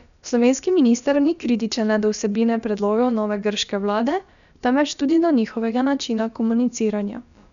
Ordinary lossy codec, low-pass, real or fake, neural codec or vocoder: none; 7.2 kHz; fake; codec, 16 kHz, about 1 kbps, DyCAST, with the encoder's durations